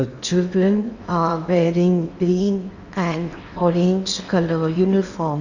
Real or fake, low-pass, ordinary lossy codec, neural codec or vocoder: fake; 7.2 kHz; none; codec, 16 kHz in and 24 kHz out, 0.8 kbps, FocalCodec, streaming, 65536 codes